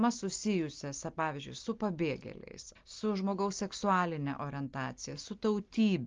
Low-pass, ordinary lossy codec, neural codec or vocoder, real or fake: 7.2 kHz; Opus, 32 kbps; none; real